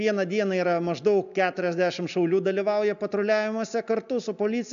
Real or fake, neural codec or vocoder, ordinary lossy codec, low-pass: real; none; AAC, 96 kbps; 7.2 kHz